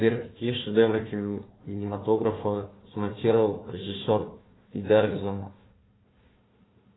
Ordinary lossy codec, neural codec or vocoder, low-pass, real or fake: AAC, 16 kbps; codec, 16 kHz, 1 kbps, FunCodec, trained on Chinese and English, 50 frames a second; 7.2 kHz; fake